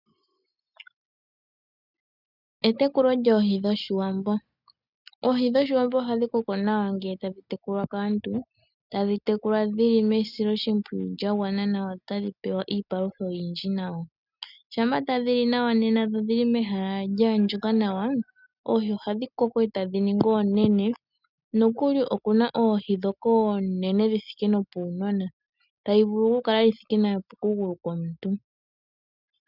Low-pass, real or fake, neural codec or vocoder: 5.4 kHz; real; none